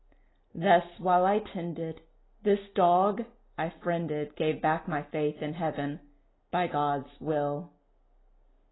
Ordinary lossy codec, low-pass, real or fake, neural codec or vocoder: AAC, 16 kbps; 7.2 kHz; real; none